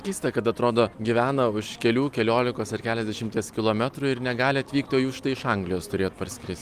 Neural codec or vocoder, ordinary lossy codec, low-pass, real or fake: none; Opus, 32 kbps; 14.4 kHz; real